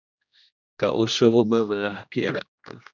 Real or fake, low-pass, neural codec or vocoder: fake; 7.2 kHz; codec, 16 kHz, 1 kbps, X-Codec, HuBERT features, trained on general audio